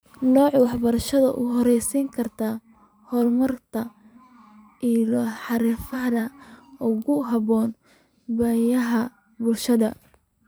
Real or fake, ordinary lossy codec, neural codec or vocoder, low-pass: fake; none; vocoder, 44.1 kHz, 128 mel bands every 512 samples, BigVGAN v2; none